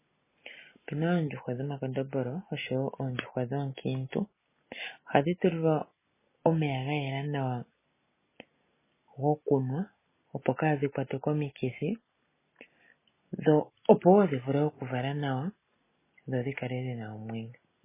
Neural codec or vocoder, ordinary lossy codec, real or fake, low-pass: none; MP3, 16 kbps; real; 3.6 kHz